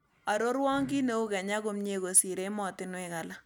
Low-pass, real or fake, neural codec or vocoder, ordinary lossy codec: 19.8 kHz; real; none; none